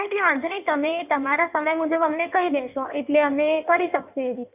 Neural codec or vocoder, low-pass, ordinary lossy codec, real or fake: codec, 16 kHz in and 24 kHz out, 1.1 kbps, FireRedTTS-2 codec; 3.6 kHz; none; fake